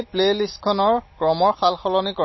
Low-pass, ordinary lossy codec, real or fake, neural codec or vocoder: 7.2 kHz; MP3, 24 kbps; real; none